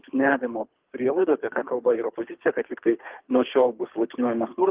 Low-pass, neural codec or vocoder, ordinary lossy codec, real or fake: 3.6 kHz; codec, 24 kHz, 3 kbps, HILCodec; Opus, 32 kbps; fake